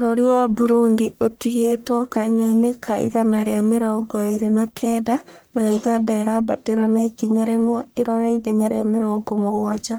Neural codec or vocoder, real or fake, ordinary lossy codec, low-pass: codec, 44.1 kHz, 1.7 kbps, Pupu-Codec; fake; none; none